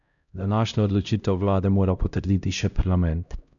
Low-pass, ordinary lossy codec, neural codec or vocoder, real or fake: 7.2 kHz; none; codec, 16 kHz, 0.5 kbps, X-Codec, HuBERT features, trained on LibriSpeech; fake